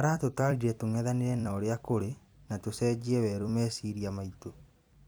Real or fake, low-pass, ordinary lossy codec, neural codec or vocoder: fake; none; none; vocoder, 44.1 kHz, 128 mel bands every 256 samples, BigVGAN v2